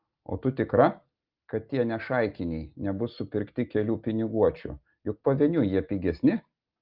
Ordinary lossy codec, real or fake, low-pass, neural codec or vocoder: Opus, 32 kbps; real; 5.4 kHz; none